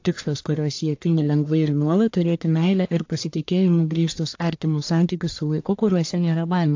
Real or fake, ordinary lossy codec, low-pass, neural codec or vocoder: fake; AAC, 48 kbps; 7.2 kHz; codec, 44.1 kHz, 1.7 kbps, Pupu-Codec